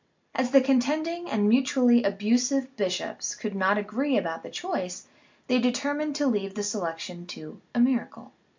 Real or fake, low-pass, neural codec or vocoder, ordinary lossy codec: real; 7.2 kHz; none; MP3, 64 kbps